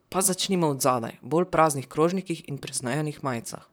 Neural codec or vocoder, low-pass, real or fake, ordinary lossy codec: vocoder, 44.1 kHz, 128 mel bands, Pupu-Vocoder; none; fake; none